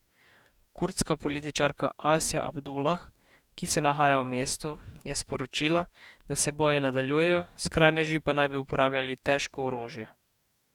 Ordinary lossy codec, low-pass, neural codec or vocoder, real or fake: none; 19.8 kHz; codec, 44.1 kHz, 2.6 kbps, DAC; fake